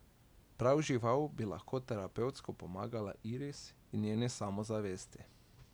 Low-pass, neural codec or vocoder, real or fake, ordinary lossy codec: none; none; real; none